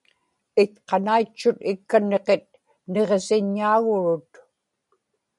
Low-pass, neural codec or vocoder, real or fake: 10.8 kHz; none; real